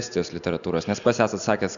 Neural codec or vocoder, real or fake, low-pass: none; real; 7.2 kHz